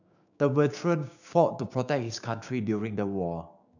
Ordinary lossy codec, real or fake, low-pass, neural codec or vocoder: none; fake; 7.2 kHz; codec, 16 kHz, 6 kbps, DAC